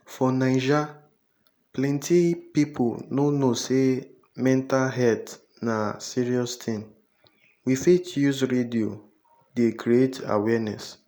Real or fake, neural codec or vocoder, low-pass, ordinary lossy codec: real; none; none; none